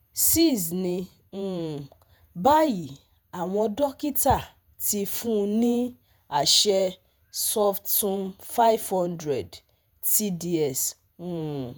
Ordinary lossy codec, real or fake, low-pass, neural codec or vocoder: none; fake; none; vocoder, 48 kHz, 128 mel bands, Vocos